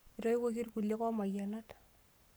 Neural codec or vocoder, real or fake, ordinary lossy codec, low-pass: codec, 44.1 kHz, 7.8 kbps, Pupu-Codec; fake; none; none